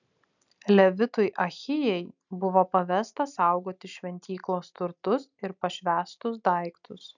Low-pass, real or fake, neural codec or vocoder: 7.2 kHz; real; none